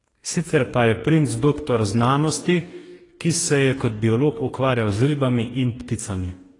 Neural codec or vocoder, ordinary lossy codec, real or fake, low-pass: codec, 44.1 kHz, 2.6 kbps, DAC; AAC, 32 kbps; fake; 10.8 kHz